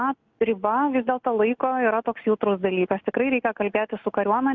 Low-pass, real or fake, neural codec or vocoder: 7.2 kHz; real; none